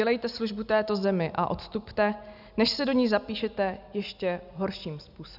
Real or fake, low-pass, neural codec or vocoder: real; 5.4 kHz; none